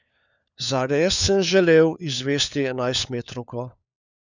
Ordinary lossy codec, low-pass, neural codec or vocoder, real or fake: none; 7.2 kHz; codec, 16 kHz, 4 kbps, FunCodec, trained on LibriTTS, 50 frames a second; fake